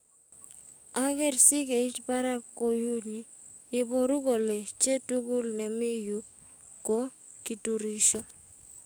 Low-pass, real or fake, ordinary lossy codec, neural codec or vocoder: none; fake; none; codec, 44.1 kHz, 7.8 kbps, DAC